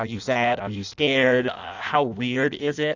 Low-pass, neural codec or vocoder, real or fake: 7.2 kHz; codec, 16 kHz in and 24 kHz out, 0.6 kbps, FireRedTTS-2 codec; fake